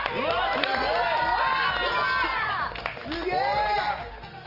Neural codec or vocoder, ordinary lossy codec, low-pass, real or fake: none; Opus, 24 kbps; 5.4 kHz; real